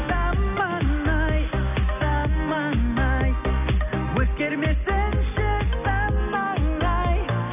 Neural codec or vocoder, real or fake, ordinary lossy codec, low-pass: none; real; none; 3.6 kHz